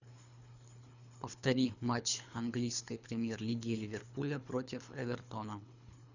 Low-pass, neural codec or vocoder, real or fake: 7.2 kHz; codec, 24 kHz, 3 kbps, HILCodec; fake